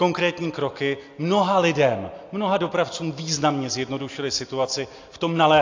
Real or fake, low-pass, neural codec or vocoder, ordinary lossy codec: real; 7.2 kHz; none; AAC, 48 kbps